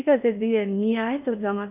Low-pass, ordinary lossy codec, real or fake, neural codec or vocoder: 3.6 kHz; none; fake; codec, 16 kHz in and 24 kHz out, 0.6 kbps, FocalCodec, streaming, 4096 codes